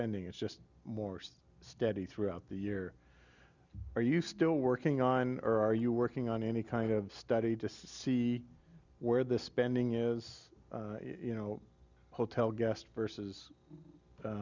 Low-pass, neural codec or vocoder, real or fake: 7.2 kHz; none; real